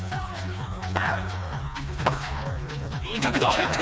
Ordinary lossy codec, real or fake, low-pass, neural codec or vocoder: none; fake; none; codec, 16 kHz, 2 kbps, FreqCodec, smaller model